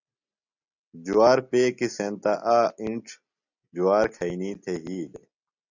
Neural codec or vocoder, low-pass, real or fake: none; 7.2 kHz; real